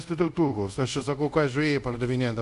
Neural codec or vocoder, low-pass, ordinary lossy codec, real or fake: codec, 24 kHz, 0.5 kbps, DualCodec; 10.8 kHz; MP3, 64 kbps; fake